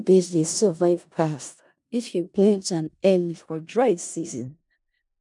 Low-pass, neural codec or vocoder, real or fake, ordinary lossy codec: 10.8 kHz; codec, 16 kHz in and 24 kHz out, 0.4 kbps, LongCat-Audio-Codec, four codebook decoder; fake; none